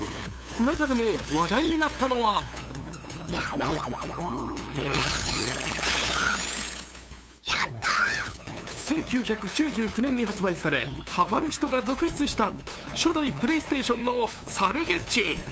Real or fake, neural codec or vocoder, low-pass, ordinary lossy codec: fake; codec, 16 kHz, 2 kbps, FunCodec, trained on LibriTTS, 25 frames a second; none; none